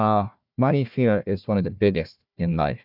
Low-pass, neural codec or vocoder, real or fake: 5.4 kHz; codec, 16 kHz, 1 kbps, FunCodec, trained on Chinese and English, 50 frames a second; fake